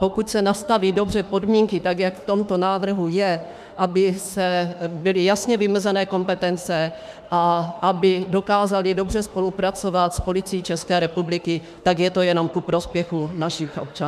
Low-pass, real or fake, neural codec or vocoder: 14.4 kHz; fake; autoencoder, 48 kHz, 32 numbers a frame, DAC-VAE, trained on Japanese speech